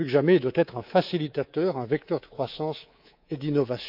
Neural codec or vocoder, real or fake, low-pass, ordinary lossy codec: codec, 24 kHz, 3.1 kbps, DualCodec; fake; 5.4 kHz; none